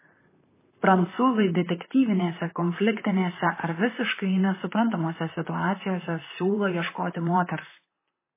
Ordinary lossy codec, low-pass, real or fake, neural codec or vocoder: MP3, 16 kbps; 3.6 kHz; fake; vocoder, 44.1 kHz, 128 mel bands, Pupu-Vocoder